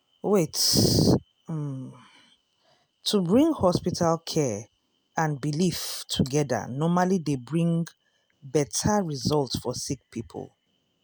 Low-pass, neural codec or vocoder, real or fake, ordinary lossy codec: none; none; real; none